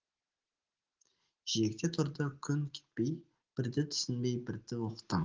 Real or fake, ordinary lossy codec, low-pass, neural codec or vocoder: real; Opus, 16 kbps; 7.2 kHz; none